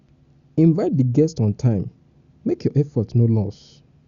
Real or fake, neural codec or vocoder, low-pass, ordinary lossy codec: real; none; 7.2 kHz; Opus, 64 kbps